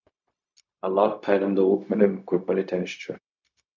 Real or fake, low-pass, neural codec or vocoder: fake; 7.2 kHz; codec, 16 kHz, 0.4 kbps, LongCat-Audio-Codec